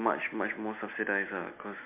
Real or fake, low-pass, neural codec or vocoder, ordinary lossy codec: real; 3.6 kHz; none; MP3, 32 kbps